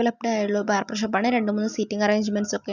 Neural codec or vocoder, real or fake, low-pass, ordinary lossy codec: none; real; 7.2 kHz; none